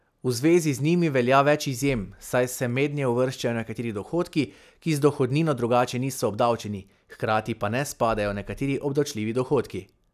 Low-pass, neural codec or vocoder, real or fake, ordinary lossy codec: 14.4 kHz; none; real; none